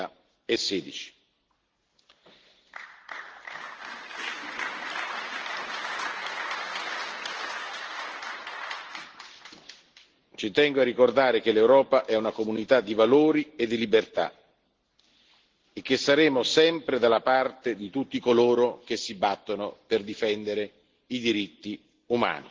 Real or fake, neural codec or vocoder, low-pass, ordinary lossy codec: real; none; 7.2 kHz; Opus, 16 kbps